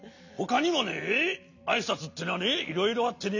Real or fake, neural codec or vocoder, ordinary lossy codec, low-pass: real; none; none; 7.2 kHz